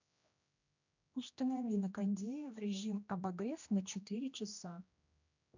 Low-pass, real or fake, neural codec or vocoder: 7.2 kHz; fake; codec, 16 kHz, 1 kbps, X-Codec, HuBERT features, trained on general audio